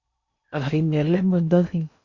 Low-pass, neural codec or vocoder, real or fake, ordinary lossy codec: 7.2 kHz; codec, 16 kHz in and 24 kHz out, 0.6 kbps, FocalCodec, streaming, 4096 codes; fake; Opus, 64 kbps